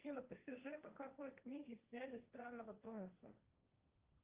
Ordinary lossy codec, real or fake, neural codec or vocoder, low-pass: Opus, 24 kbps; fake; codec, 16 kHz, 1.1 kbps, Voila-Tokenizer; 3.6 kHz